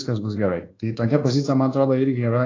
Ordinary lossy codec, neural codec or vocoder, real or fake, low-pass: AAC, 32 kbps; codec, 24 kHz, 1.2 kbps, DualCodec; fake; 7.2 kHz